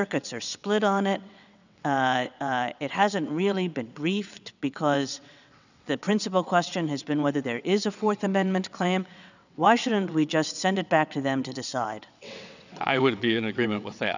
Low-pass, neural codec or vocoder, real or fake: 7.2 kHz; vocoder, 22.05 kHz, 80 mel bands, WaveNeXt; fake